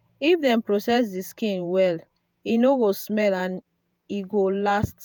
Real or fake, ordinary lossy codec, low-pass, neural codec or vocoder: fake; none; none; vocoder, 48 kHz, 128 mel bands, Vocos